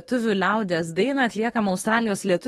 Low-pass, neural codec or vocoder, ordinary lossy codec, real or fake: 19.8 kHz; autoencoder, 48 kHz, 32 numbers a frame, DAC-VAE, trained on Japanese speech; AAC, 32 kbps; fake